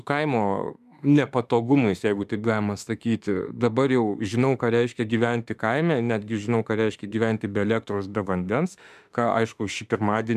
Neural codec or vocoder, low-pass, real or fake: autoencoder, 48 kHz, 32 numbers a frame, DAC-VAE, trained on Japanese speech; 14.4 kHz; fake